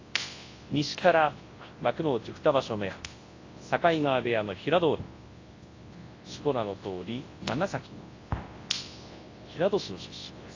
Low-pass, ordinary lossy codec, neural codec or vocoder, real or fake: 7.2 kHz; AAC, 32 kbps; codec, 24 kHz, 0.9 kbps, WavTokenizer, large speech release; fake